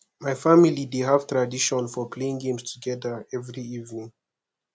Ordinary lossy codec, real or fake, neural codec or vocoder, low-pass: none; real; none; none